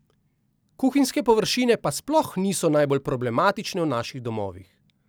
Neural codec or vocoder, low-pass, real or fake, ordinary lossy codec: none; none; real; none